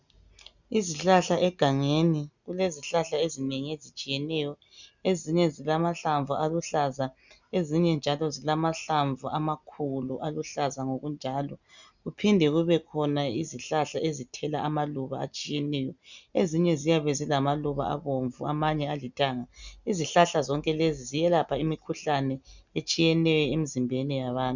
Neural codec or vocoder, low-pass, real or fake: none; 7.2 kHz; real